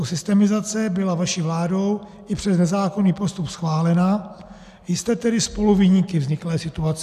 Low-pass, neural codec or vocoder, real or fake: 14.4 kHz; none; real